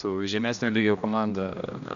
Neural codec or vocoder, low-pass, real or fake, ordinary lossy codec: codec, 16 kHz, 1 kbps, X-Codec, HuBERT features, trained on general audio; 7.2 kHz; fake; MP3, 96 kbps